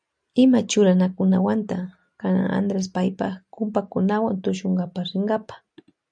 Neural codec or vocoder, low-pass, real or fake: none; 9.9 kHz; real